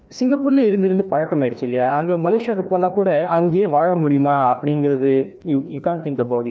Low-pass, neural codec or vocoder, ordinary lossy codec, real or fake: none; codec, 16 kHz, 1 kbps, FreqCodec, larger model; none; fake